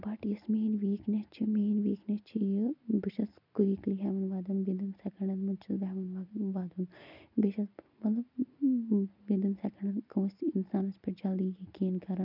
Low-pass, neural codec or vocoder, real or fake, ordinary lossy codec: 5.4 kHz; none; real; none